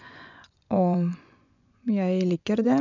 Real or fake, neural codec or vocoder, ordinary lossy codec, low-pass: fake; vocoder, 24 kHz, 100 mel bands, Vocos; none; 7.2 kHz